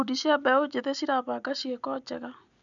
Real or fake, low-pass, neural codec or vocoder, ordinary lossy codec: real; 7.2 kHz; none; none